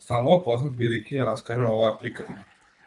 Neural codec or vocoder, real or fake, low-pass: codec, 24 kHz, 3 kbps, HILCodec; fake; 10.8 kHz